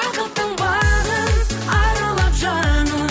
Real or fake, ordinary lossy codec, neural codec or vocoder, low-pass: real; none; none; none